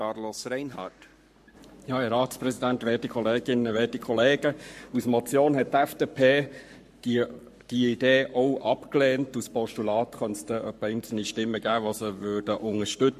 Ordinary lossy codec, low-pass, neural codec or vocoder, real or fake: MP3, 64 kbps; 14.4 kHz; codec, 44.1 kHz, 7.8 kbps, Pupu-Codec; fake